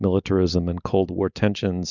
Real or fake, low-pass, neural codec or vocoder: real; 7.2 kHz; none